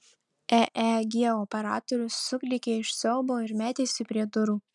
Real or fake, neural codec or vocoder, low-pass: real; none; 10.8 kHz